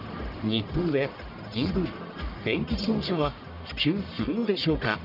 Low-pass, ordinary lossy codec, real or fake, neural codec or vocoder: 5.4 kHz; none; fake; codec, 44.1 kHz, 1.7 kbps, Pupu-Codec